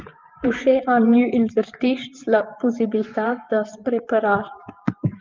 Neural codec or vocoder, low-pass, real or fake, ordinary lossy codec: vocoder, 24 kHz, 100 mel bands, Vocos; 7.2 kHz; fake; Opus, 32 kbps